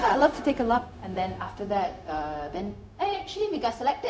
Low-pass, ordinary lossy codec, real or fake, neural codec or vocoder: none; none; fake; codec, 16 kHz, 0.4 kbps, LongCat-Audio-Codec